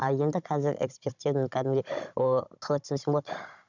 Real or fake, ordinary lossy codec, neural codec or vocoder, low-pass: fake; none; codec, 16 kHz, 16 kbps, FunCodec, trained on Chinese and English, 50 frames a second; 7.2 kHz